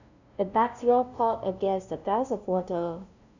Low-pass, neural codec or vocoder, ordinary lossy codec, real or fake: 7.2 kHz; codec, 16 kHz, 0.5 kbps, FunCodec, trained on LibriTTS, 25 frames a second; none; fake